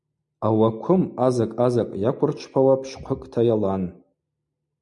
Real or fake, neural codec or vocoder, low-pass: real; none; 10.8 kHz